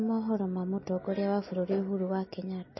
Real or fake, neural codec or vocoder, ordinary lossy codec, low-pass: real; none; MP3, 24 kbps; 7.2 kHz